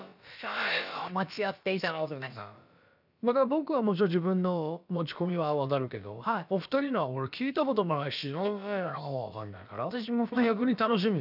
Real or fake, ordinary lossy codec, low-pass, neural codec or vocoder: fake; none; 5.4 kHz; codec, 16 kHz, about 1 kbps, DyCAST, with the encoder's durations